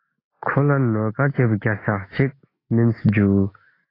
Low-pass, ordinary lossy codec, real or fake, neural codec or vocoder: 5.4 kHz; AAC, 24 kbps; fake; autoencoder, 48 kHz, 128 numbers a frame, DAC-VAE, trained on Japanese speech